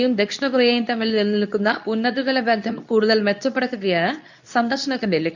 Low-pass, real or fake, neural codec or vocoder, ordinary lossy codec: 7.2 kHz; fake; codec, 24 kHz, 0.9 kbps, WavTokenizer, medium speech release version 2; none